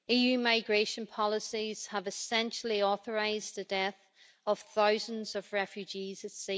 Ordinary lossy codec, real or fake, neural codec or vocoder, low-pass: none; real; none; none